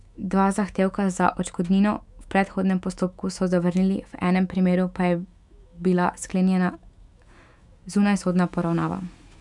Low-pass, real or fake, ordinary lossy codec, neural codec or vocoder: 10.8 kHz; fake; none; autoencoder, 48 kHz, 128 numbers a frame, DAC-VAE, trained on Japanese speech